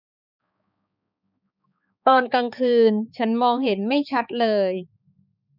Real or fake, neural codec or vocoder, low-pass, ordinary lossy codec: fake; codec, 16 kHz, 4 kbps, X-Codec, HuBERT features, trained on balanced general audio; 5.4 kHz; none